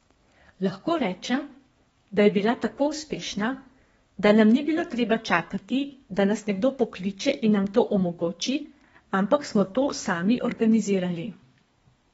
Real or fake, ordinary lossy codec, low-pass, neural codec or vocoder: fake; AAC, 24 kbps; 14.4 kHz; codec, 32 kHz, 1.9 kbps, SNAC